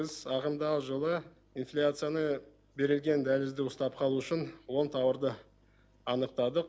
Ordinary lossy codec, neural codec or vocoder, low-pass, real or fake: none; none; none; real